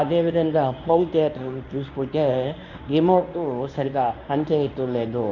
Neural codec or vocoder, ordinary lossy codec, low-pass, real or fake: codec, 24 kHz, 0.9 kbps, WavTokenizer, medium speech release version 1; none; 7.2 kHz; fake